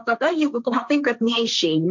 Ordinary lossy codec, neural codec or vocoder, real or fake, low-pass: MP3, 64 kbps; codec, 16 kHz, 1.1 kbps, Voila-Tokenizer; fake; 7.2 kHz